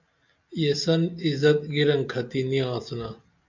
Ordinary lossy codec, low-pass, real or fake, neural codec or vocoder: MP3, 64 kbps; 7.2 kHz; real; none